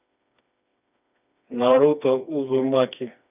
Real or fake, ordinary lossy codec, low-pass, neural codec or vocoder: fake; none; 3.6 kHz; codec, 16 kHz, 2 kbps, FreqCodec, smaller model